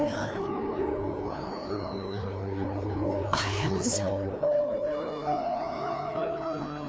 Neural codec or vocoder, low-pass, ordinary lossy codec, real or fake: codec, 16 kHz, 2 kbps, FreqCodec, larger model; none; none; fake